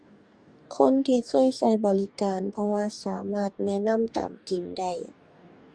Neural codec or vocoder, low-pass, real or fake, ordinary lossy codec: codec, 44.1 kHz, 2.6 kbps, DAC; 9.9 kHz; fake; Opus, 64 kbps